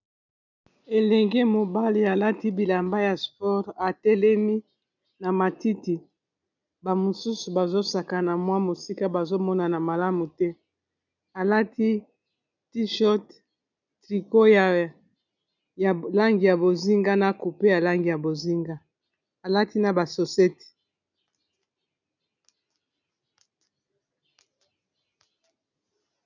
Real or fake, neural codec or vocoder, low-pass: real; none; 7.2 kHz